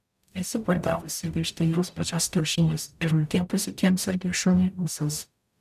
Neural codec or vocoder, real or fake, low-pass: codec, 44.1 kHz, 0.9 kbps, DAC; fake; 14.4 kHz